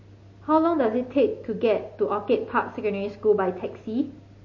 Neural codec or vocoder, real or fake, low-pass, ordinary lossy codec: none; real; 7.2 kHz; MP3, 32 kbps